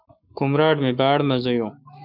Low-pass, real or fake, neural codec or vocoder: 5.4 kHz; fake; codec, 44.1 kHz, 7.8 kbps, Pupu-Codec